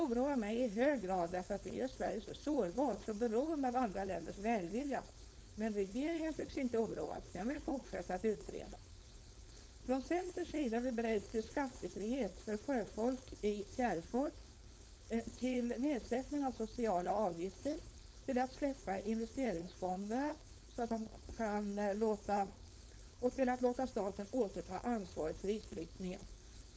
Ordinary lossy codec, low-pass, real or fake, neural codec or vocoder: none; none; fake; codec, 16 kHz, 4.8 kbps, FACodec